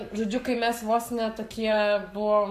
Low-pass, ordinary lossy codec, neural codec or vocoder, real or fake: 14.4 kHz; AAC, 96 kbps; codec, 44.1 kHz, 7.8 kbps, Pupu-Codec; fake